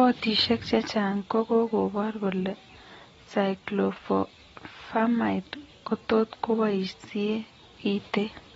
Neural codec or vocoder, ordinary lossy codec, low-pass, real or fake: none; AAC, 24 kbps; 19.8 kHz; real